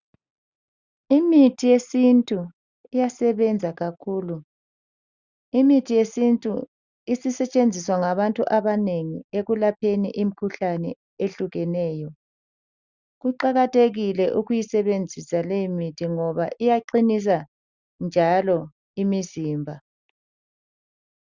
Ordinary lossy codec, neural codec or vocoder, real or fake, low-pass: Opus, 64 kbps; none; real; 7.2 kHz